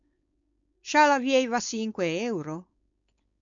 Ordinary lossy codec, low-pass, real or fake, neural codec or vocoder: MP3, 64 kbps; 7.2 kHz; fake; codec, 16 kHz, 4.8 kbps, FACodec